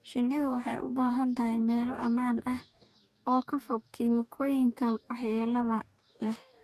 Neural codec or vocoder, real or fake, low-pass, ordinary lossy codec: codec, 44.1 kHz, 2.6 kbps, DAC; fake; 14.4 kHz; none